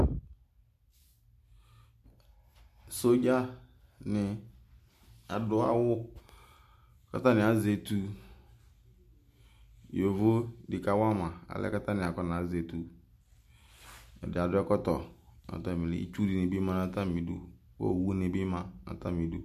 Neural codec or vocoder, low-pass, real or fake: none; 14.4 kHz; real